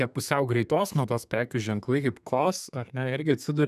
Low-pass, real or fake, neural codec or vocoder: 14.4 kHz; fake; codec, 32 kHz, 1.9 kbps, SNAC